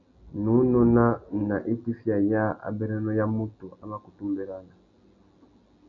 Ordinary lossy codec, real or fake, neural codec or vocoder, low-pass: MP3, 64 kbps; real; none; 7.2 kHz